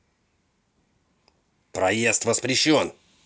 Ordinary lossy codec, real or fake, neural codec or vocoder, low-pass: none; real; none; none